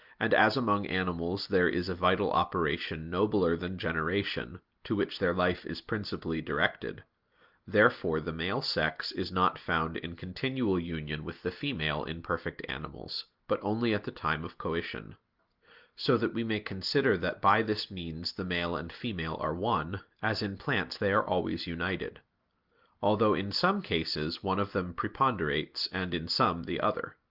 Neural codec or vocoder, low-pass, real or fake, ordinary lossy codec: none; 5.4 kHz; real; Opus, 32 kbps